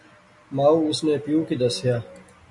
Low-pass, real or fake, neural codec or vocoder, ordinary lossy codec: 10.8 kHz; real; none; MP3, 48 kbps